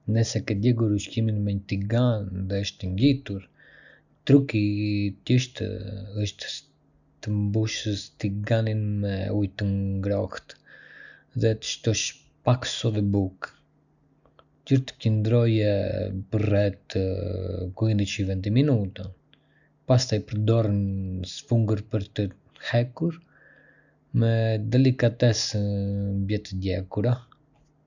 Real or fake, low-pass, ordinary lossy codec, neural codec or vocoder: real; 7.2 kHz; none; none